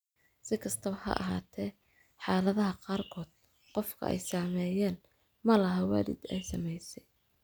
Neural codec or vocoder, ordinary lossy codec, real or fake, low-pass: none; none; real; none